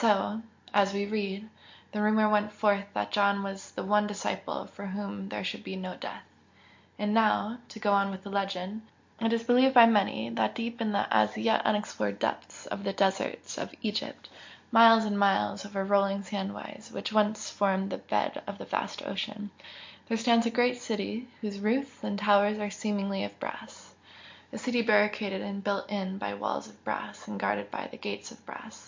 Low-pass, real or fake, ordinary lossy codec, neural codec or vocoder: 7.2 kHz; real; MP3, 48 kbps; none